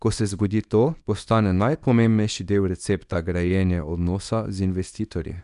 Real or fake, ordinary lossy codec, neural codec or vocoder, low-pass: fake; none; codec, 24 kHz, 0.9 kbps, WavTokenizer, small release; 10.8 kHz